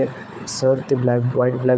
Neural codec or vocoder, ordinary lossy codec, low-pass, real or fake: codec, 16 kHz, 4 kbps, FunCodec, trained on Chinese and English, 50 frames a second; none; none; fake